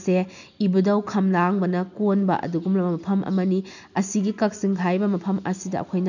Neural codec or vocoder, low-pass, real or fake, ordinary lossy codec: none; 7.2 kHz; real; none